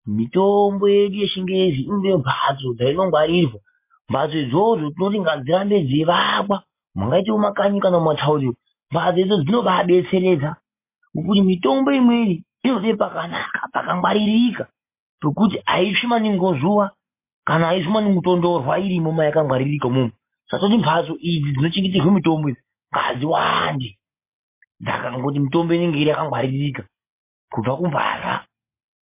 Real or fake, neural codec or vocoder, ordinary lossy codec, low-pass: real; none; MP3, 24 kbps; 3.6 kHz